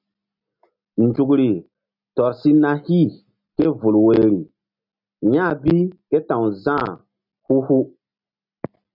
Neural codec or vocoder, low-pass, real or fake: none; 5.4 kHz; real